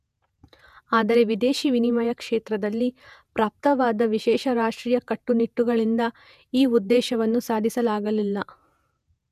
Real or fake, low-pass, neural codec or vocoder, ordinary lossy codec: fake; 14.4 kHz; vocoder, 48 kHz, 128 mel bands, Vocos; none